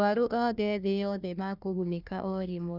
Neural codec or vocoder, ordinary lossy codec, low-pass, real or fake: codec, 16 kHz, 1 kbps, FunCodec, trained on Chinese and English, 50 frames a second; none; 5.4 kHz; fake